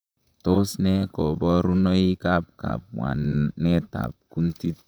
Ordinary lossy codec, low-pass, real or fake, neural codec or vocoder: none; none; fake; vocoder, 44.1 kHz, 128 mel bands, Pupu-Vocoder